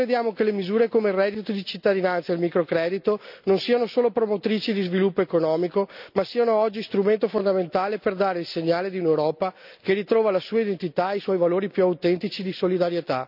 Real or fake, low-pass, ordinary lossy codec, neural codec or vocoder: real; 5.4 kHz; none; none